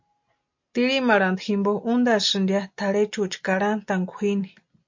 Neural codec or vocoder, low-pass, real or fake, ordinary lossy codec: none; 7.2 kHz; real; MP3, 48 kbps